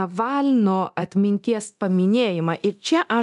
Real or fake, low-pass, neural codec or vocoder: fake; 10.8 kHz; codec, 24 kHz, 0.9 kbps, DualCodec